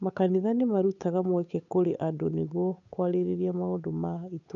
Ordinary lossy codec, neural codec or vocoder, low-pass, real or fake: none; codec, 16 kHz, 8 kbps, FunCodec, trained on Chinese and English, 25 frames a second; 7.2 kHz; fake